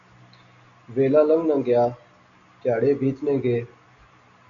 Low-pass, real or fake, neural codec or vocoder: 7.2 kHz; real; none